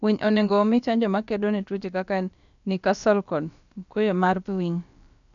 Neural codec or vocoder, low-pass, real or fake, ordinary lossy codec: codec, 16 kHz, about 1 kbps, DyCAST, with the encoder's durations; 7.2 kHz; fake; none